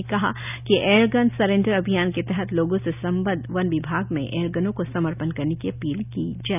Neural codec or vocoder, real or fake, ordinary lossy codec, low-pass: none; real; none; 3.6 kHz